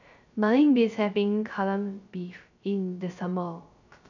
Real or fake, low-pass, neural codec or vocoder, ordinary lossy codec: fake; 7.2 kHz; codec, 16 kHz, 0.3 kbps, FocalCodec; none